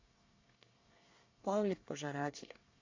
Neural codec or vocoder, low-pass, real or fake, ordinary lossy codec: codec, 24 kHz, 1 kbps, SNAC; 7.2 kHz; fake; AAC, 48 kbps